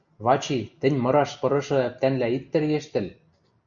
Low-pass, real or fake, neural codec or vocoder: 7.2 kHz; real; none